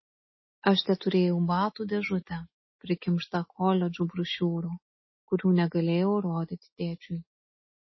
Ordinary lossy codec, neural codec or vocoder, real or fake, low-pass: MP3, 24 kbps; none; real; 7.2 kHz